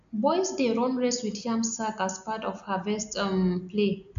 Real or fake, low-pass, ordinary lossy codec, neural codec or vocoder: real; 7.2 kHz; none; none